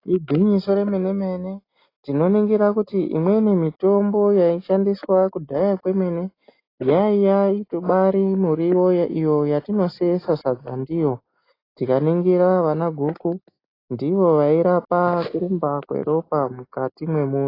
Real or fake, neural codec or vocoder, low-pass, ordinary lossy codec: real; none; 5.4 kHz; AAC, 24 kbps